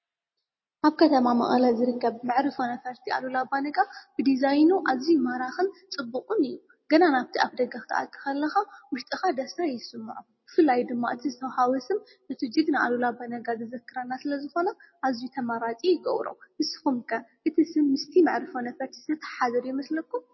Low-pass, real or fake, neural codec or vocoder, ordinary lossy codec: 7.2 kHz; real; none; MP3, 24 kbps